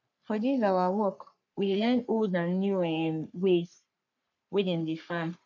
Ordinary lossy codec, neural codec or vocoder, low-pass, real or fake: none; codec, 44.1 kHz, 3.4 kbps, Pupu-Codec; 7.2 kHz; fake